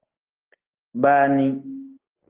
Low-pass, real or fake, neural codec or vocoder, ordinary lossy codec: 3.6 kHz; real; none; Opus, 16 kbps